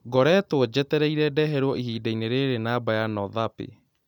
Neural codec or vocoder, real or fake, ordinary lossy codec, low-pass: none; real; none; 19.8 kHz